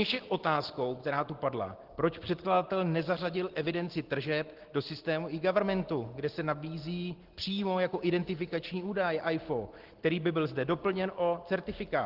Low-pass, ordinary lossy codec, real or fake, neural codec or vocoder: 5.4 kHz; Opus, 16 kbps; real; none